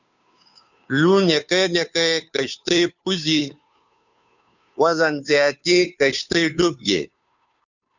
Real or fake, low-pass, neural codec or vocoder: fake; 7.2 kHz; codec, 16 kHz, 2 kbps, FunCodec, trained on Chinese and English, 25 frames a second